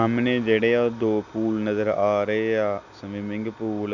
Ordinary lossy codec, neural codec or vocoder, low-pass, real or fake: none; none; 7.2 kHz; real